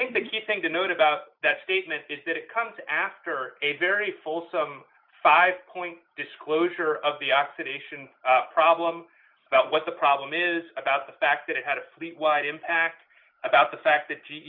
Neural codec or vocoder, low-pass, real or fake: none; 5.4 kHz; real